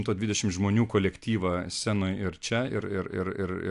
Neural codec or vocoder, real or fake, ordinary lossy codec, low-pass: none; real; AAC, 64 kbps; 10.8 kHz